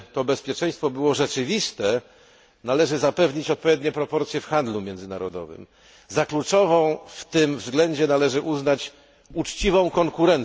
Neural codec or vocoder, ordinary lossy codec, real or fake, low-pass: none; none; real; none